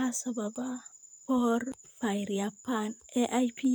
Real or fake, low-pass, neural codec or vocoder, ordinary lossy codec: fake; none; vocoder, 44.1 kHz, 128 mel bands, Pupu-Vocoder; none